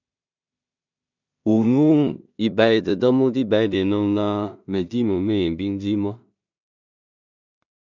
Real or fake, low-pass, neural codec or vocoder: fake; 7.2 kHz; codec, 16 kHz in and 24 kHz out, 0.4 kbps, LongCat-Audio-Codec, two codebook decoder